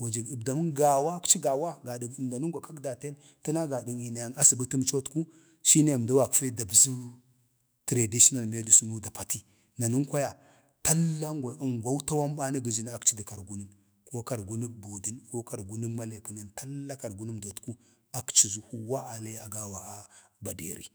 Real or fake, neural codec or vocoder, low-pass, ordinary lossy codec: fake; autoencoder, 48 kHz, 128 numbers a frame, DAC-VAE, trained on Japanese speech; none; none